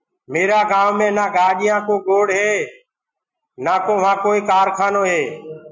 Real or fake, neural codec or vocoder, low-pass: real; none; 7.2 kHz